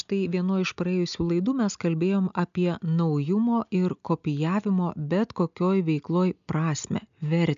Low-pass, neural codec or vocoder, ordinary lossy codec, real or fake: 7.2 kHz; none; AAC, 96 kbps; real